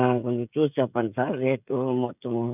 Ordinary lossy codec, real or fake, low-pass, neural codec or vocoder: none; fake; 3.6 kHz; codec, 16 kHz, 16 kbps, FreqCodec, smaller model